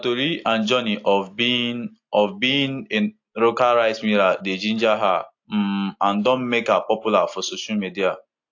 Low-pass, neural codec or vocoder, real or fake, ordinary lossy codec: 7.2 kHz; none; real; AAC, 48 kbps